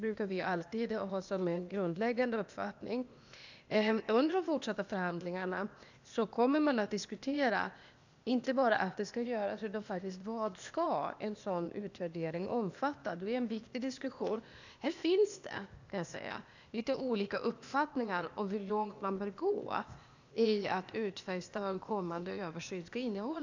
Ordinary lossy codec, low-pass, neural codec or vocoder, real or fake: none; 7.2 kHz; codec, 16 kHz, 0.8 kbps, ZipCodec; fake